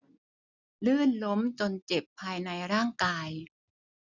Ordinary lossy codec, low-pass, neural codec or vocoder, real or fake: none; 7.2 kHz; none; real